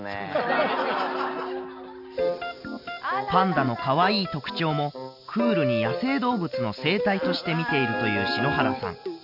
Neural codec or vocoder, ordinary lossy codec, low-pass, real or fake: none; none; 5.4 kHz; real